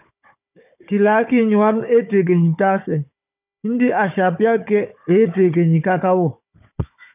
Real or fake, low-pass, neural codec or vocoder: fake; 3.6 kHz; codec, 16 kHz, 4 kbps, FunCodec, trained on Chinese and English, 50 frames a second